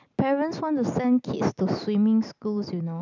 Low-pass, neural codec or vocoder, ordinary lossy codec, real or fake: 7.2 kHz; none; none; real